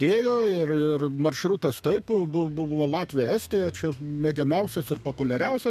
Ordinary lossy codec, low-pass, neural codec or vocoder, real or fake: MP3, 96 kbps; 14.4 kHz; codec, 32 kHz, 1.9 kbps, SNAC; fake